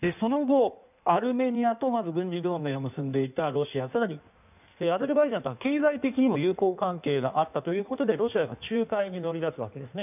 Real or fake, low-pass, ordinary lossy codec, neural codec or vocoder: fake; 3.6 kHz; none; codec, 16 kHz in and 24 kHz out, 1.1 kbps, FireRedTTS-2 codec